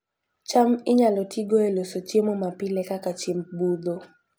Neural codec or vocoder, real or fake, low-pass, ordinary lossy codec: none; real; none; none